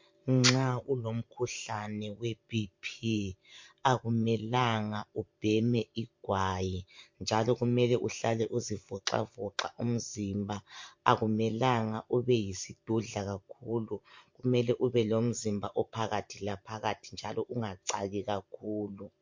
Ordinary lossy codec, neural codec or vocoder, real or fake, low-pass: MP3, 48 kbps; vocoder, 44.1 kHz, 128 mel bands every 512 samples, BigVGAN v2; fake; 7.2 kHz